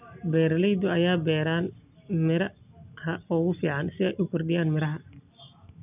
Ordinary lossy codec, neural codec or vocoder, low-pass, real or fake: none; none; 3.6 kHz; real